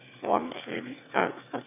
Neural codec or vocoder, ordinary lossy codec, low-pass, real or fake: autoencoder, 22.05 kHz, a latent of 192 numbers a frame, VITS, trained on one speaker; none; 3.6 kHz; fake